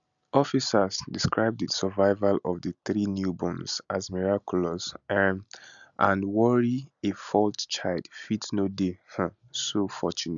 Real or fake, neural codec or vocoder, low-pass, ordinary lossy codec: real; none; 7.2 kHz; none